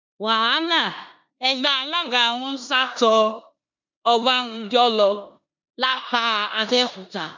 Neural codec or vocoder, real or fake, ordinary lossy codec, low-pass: codec, 16 kHz in and 24 kHz out, 0.9 kbps, LongCat-Audio-Codec, four codebook decoder; fake; MP3, 64 kbps; 7.2 kHz